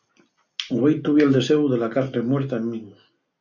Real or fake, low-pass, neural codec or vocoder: real; 7.2 kHz; none